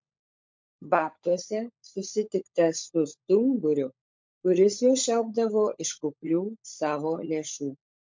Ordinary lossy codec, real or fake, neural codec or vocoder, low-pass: MP3, 48 kbps; fake; codec, 16 kHz, 16 kbps, FunCodec, trained on LibriTTS, 50 frames a second; 7.2 kHz